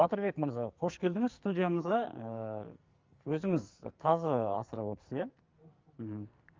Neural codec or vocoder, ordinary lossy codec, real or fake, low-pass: codec, 44.1 kHz, 2.6 kbps, SNAC; Opus, 32 kbps; fake; 7.2 kHz